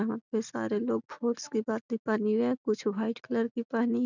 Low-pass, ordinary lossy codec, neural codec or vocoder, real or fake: 7.2 kHz; none; none; real